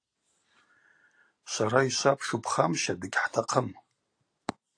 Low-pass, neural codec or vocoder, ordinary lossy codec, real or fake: 9.9 kHz; none; AAC, 48 kbps; real